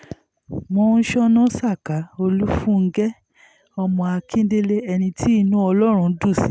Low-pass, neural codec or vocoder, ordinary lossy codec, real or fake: none; none; none; real